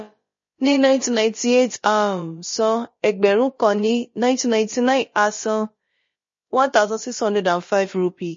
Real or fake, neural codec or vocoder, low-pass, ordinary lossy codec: fake; codec, 16 kHz, about 1 kbps, DyCAST, with the encoder's durations; 7.2 kHz; MP3, 32 kbps